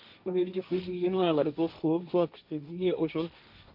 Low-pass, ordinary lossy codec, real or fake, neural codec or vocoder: 5.4 kHz; none; fake; codec, 16 kHz, 1.1 kbps, Voila-Tokenizer